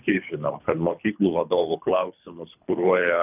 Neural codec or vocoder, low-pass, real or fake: codec, 24 kHz, 3 kbps, HILCodec; 3.6 kHz; fake